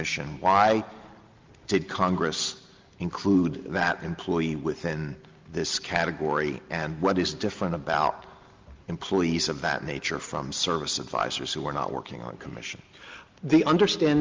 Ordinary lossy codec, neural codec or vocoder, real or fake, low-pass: Opus, 24 kbps; none; real; 7.2 kHz